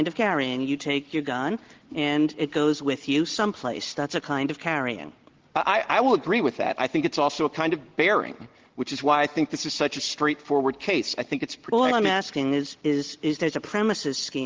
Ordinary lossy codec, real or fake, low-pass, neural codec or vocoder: Opus, 16 kbps; real; 7.2 kHz; none